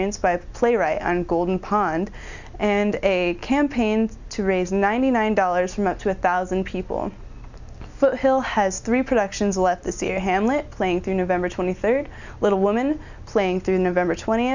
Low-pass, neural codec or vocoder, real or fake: 7.2 kHz; none; real